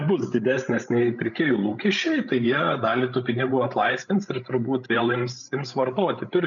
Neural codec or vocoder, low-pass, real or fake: codec, 16 kHz, 16 kbps, FreqCodec, larger model; 7.2 kHz; fake